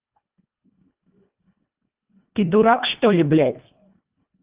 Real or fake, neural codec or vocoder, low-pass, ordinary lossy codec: fake; codec, 24 kHz, 1.5 kbps, HILCodec; 3.6 kHz; Opus, 32 kbps